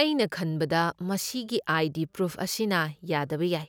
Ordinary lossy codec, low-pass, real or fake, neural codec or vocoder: none; none; real; none